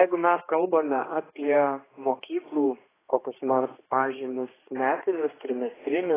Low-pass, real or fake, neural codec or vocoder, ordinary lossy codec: 3.6 kHz; fake; codec, 16 kHz, 2 kbps, X-Codec, HuBERT features, trained on general audio; AAC, 16 kbps